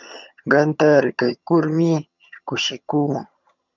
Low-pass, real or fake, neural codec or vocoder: 7.2 kHz; fake; vocoder, 22.05 kHz, 80 mel bands, HiFi-GAN